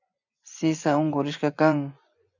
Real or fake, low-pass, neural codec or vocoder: fake; 7.2 kHz; vocoder, 24 kHz, 100 mel bands, Vocos